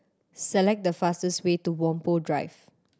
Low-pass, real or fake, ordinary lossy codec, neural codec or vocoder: none; real; none; none